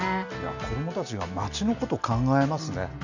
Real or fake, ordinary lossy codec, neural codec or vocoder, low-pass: real; none; none; 7.2 kHz